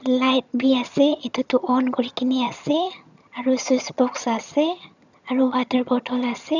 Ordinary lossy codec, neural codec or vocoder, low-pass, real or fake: none; vocoder, 22.05 kHz, 80 mel bands, HiFi-GAN; 7.2 kHz; fake